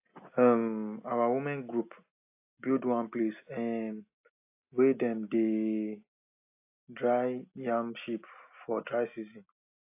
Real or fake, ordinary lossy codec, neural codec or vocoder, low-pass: real; AAC, 32 kbps; none; 3.6 kHz